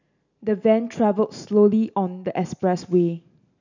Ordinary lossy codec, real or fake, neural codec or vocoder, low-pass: none; real; none; 7.2 kHz